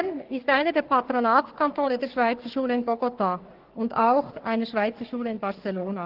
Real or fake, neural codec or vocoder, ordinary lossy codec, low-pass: fake; codec, 44.1 kHz, 3.4 kbps, Pupu-Codec; Opus, 16 kbps; 5.4 kHz